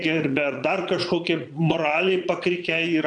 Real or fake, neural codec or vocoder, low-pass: fake; vocoder, 22.05 kHz, 80 mel bands, Vocos; 9.9 kHz